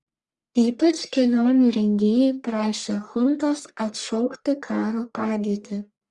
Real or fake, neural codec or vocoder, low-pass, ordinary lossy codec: fake; codec, 44.1 kHz, 1.7 kbps, Pupu-Codec; 10.8 kHz; Opus, 64 kbps